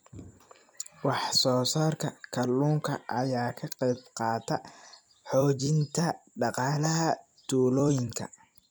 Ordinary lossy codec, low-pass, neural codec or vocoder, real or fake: none; none; vocoder, 44.1 kHz, 128 mel bands every 256 samples, BigVGAN v2; fake